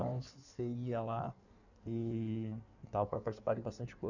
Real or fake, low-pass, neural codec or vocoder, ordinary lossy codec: fake; 7.2 kHz; codec, 16 kHz in and 24 kHz out, 1.1 kbps, FireRedTTS-2 codec; none